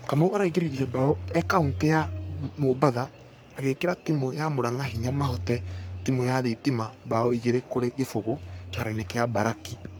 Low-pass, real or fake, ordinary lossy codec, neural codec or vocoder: none; fake; none; codec, 44.1 kHz, 3.4 kbps, Pupu-Codec